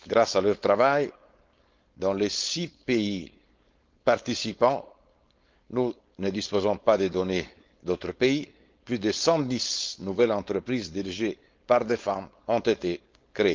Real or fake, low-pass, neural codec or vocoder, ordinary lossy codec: fake; 7.2 kHz; codec, 16 kHz, 4.8 kbps, FACodec; Opus, 32 kbps